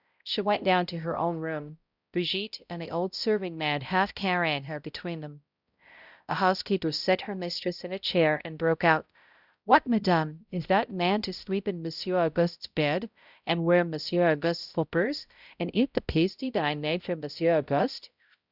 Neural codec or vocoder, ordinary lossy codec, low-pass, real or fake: codec, 16 kHz, 0.5 kbps, X-Codec, HuBERT features, trained on balanced general audio; Opus, 64 kbps; 5.4 kHz; fake